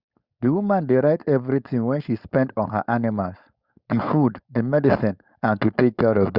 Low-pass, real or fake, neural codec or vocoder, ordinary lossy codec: 5.4 kHz; fake; codec, 16 kHz, 4.8 kbps, FACodec; Opus, 64 kbps